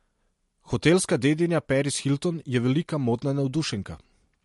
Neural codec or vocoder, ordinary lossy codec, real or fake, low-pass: none; MP3, 48 kbps; real; 14.4 kHz